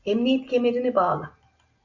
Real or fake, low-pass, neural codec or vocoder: real; 7.2 kHz; none